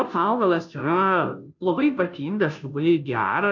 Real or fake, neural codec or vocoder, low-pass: fake; codec, 16 kHz, 0.5 kbps, FunCodec, trained on Chinese and English, 25 frames a second; 7.2 kHz